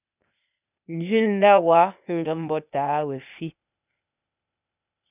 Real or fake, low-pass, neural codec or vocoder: fake; 3.6 kHz; codec, 16 kHz, 0.8 kbps, ZipCodec